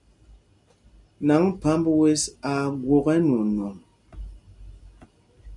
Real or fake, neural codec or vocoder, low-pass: real; none; 10.8 kHz